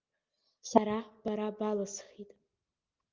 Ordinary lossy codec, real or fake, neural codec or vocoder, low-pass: Opus, 24 kbps; real; none; 7.2 kHz